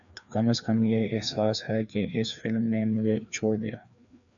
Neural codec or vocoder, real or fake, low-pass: codec, 16 kHz, 2 kbps, FreqCodec, larger model; fake; 7.2 kHz